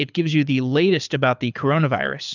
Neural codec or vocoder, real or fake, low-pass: codec, 24 kHz, 6 kbps, HILCodec; fake; 7.2 kHz